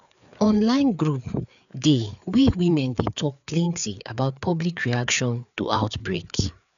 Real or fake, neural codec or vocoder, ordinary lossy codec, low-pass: fake; codec, 16 kHz, 4 kbps, FreqCodec, larger model; none; 7.2 kHz